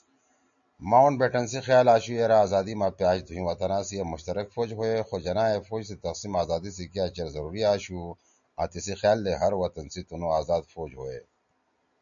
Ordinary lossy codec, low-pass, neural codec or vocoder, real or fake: MP3, 96 kbps; 7.2 kHz; none; real